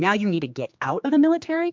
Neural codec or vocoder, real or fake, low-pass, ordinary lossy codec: codec, 16 kHz, 2 kbps, X-Codec, HuBERT features, trained on general audio; fake; 7.2 kHz; MP3, 64 kbps